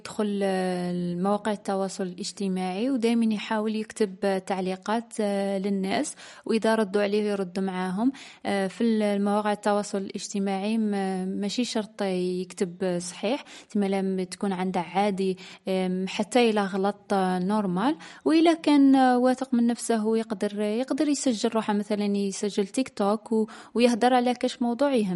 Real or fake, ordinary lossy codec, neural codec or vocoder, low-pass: real; MP3, 48 kbps; none; 14.4 kHz